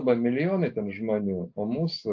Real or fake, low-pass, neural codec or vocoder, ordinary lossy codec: real; 7.2 kHz; none; AAC, 48 kbps